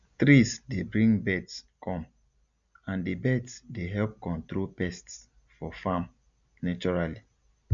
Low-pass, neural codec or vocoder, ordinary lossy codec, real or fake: 7.2 kHz; none; none; real